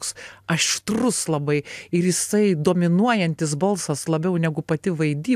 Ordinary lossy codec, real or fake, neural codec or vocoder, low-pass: AAC, 96 kbps; real; none; 14.4 kHz